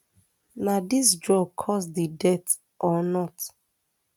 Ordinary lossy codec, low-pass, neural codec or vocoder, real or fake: none; 19.8 kHz; none; real